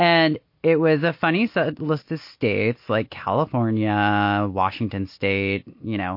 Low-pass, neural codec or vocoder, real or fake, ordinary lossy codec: 5.4 kHz; none; real; MP3, 32 kbps